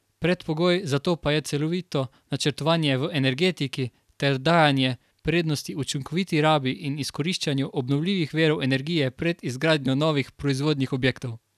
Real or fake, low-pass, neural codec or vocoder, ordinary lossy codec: real; 14.4 kHz; none; none